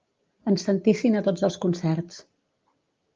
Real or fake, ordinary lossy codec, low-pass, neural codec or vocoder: real; Opus, 24 kbps; 7.2 kHz; none